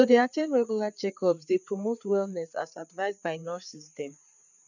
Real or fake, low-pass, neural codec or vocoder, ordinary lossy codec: fake; 7.2 kHz; codec, 16 kHz, 4 kbps, FreqCodec, larger model; none